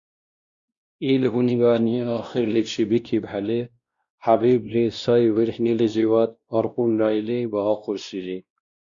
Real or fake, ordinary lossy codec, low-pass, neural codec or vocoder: fake; Opus, 64 kbps; 7.2 kHz; codec, 16 kHz, 1 kbps, X-Codec, WavLM features, trained on Multilingual LibriSpeech